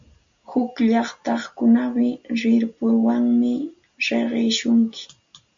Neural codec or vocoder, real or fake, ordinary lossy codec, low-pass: none; real; MP3, 48 kbps; 7.2 kHz